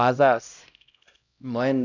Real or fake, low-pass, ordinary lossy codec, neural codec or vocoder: fake; 7.2 kHz; none; codec, 16 kHz, 0.5 kbps, X-Codec, HuBERT features, trained on LibriSpeech